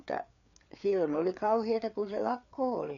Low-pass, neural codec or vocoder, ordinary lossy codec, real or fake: 7.2 kHz; codec, 16 kHz, 4 kbps, FreqCodec, larger model; none; fake